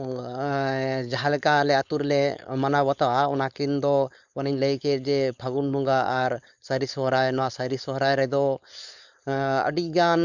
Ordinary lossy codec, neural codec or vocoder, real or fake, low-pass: none; codec, 16 kHz, 4.8 kbps, FACodec; fake; 7.2 kHz